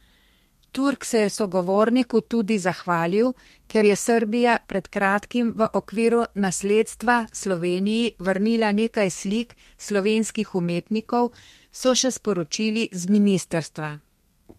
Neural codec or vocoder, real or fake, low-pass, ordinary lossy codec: codec, 32 kHz, 1.9 kbps, SNAC; fake; 14.4 kHz; MP3, 64 kbps